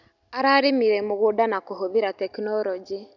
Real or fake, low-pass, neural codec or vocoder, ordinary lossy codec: real; 7.2 kHz; none; none